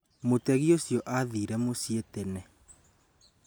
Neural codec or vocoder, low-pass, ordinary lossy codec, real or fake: none; none; none; real